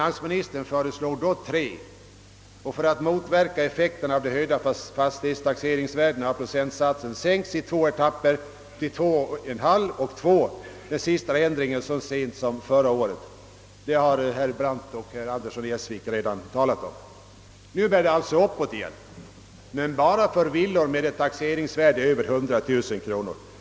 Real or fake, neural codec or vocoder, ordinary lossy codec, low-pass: real; none; none; none